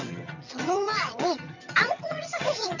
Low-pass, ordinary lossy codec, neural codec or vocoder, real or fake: 7.2 kHz; none; vocoder, 22.05 kHz, 80 mel bands, HiFi-GAN; fake